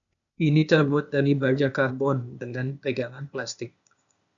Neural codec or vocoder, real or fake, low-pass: codec, 16 kHz, 0.8 kbps, ZipCodec; fake; 7.2 kHz